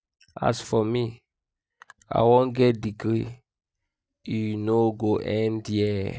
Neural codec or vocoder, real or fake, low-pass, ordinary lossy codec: none; real; none; none